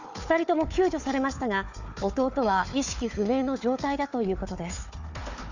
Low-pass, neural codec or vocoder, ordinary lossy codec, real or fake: 7.2 kHz; codec, 16 kHz, 4 kbps, FunCodec, trained on Chinese and English, 50 frames a second; none; fake